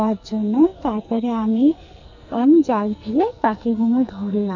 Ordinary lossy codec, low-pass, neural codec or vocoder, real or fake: none; 7.2 kHz; codec, 44.1 kHz, 2.6 kbps, SNAC; fake